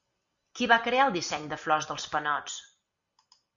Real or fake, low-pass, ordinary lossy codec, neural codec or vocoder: real; 7.2 kHz; Opus, 64 kbps; none